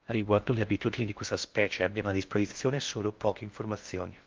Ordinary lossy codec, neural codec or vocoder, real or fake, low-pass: Opus, 32 kbps; codec, 16 kHz in and 24 kHz out, 0.6 kbps, FocalCodec, streaming, 2048 codes; fake; 7.2 kHz